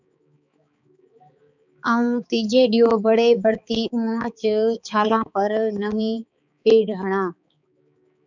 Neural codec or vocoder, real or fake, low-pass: codec, 16 kHz, 4 kbps, X-Codec, HuBERT features, trained on balanced general audio; fake; 7.2 kHz